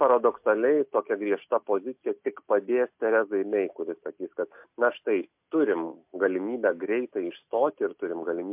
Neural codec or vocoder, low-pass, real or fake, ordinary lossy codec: none; 3.6 kHz; real; MP3, 32 kbps